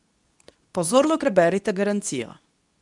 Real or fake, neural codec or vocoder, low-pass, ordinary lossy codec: fake; codec, 24 kHz, 0.9 kbps, WavTokenizer, medium speech release version 2; 10.8 kHz; none